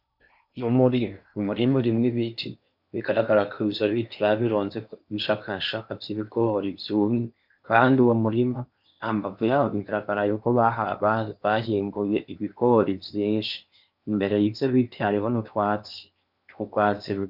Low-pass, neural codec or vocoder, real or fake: 5.4 kHz; codec, 16 kHz in and 24 kHz out, 0.8 kbps, FocalCodec, streaming, 65536 codes; fake